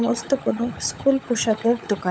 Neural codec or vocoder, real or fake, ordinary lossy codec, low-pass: codec, 16 kHz, 16 kbps, FunCodec, trained on LibriTTS, 50 frames a second; fake; none; none